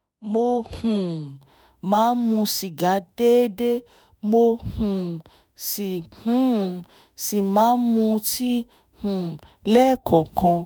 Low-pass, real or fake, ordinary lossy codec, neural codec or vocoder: none; fake; none; autoencoder, 48 kHz, 32 numbers a frame, DAC-VAE, trained on Japanese speech